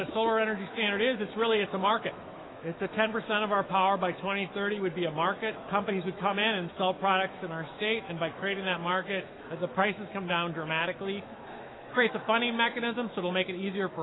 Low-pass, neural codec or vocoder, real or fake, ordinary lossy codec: 7.2 kHz; autoencoder, 48 kHz, 128 numbers a frame, DAC-VAE, trained on Japanese speech; fake; AAC, 16 kbps